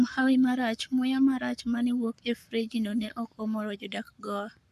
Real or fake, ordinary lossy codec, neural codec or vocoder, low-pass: fake; AAC, 96 kbps; codec, 44.1 kHz, 7.8 kbps, Pupu-Codec; 14.4 kHz